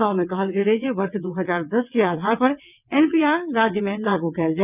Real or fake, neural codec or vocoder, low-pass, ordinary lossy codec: fake; vocoder, 22.05 kHz, 80 mel bands, WaveNeXt; 3.6 kHz; none